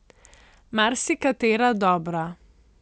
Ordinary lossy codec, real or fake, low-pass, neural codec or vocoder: none; real; none; none